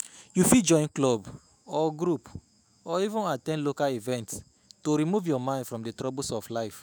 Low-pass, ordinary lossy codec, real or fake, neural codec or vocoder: none; none; fake; autoencoder, 48 kHz, 128 numbers a frame, DAC-VAE, trained on Japanese speech